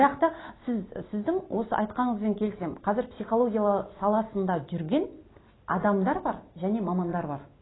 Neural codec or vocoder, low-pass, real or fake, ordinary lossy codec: none; 7.2 kHz; real; AAC, 16 kbps